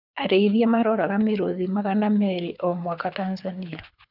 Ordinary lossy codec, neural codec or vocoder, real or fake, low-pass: none; codec, 24 kHz, 6 kbps, HILCodec; fake; 5.4 kHz